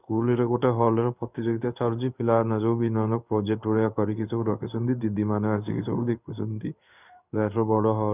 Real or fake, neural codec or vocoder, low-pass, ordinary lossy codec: fake; codec, 16 kHz in and 24 kHz out, 1 kbps, XY-Tokenizer; 3.6 kHz; none